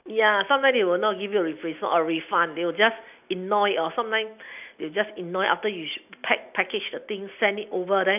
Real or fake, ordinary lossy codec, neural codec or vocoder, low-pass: real; none; none; 3.6 kHz